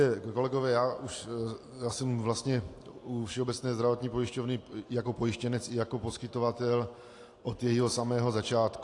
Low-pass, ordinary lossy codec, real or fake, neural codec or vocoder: 10.8 kHz; AAC, 48 kbps; real; none